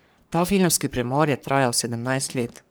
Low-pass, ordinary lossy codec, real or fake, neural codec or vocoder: none; none; fake; codec, 44.1 kHz, 3.4 kbps, Pupu-Codec